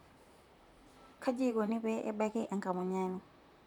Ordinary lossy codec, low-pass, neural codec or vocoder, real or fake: none; 19.8 kHz; vocoder, 44.1 kHz, 128 mel bands, Pupu-Vocoder; fake